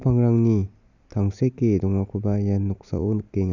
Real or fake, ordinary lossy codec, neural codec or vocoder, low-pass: real; none; none; 7.2 kHz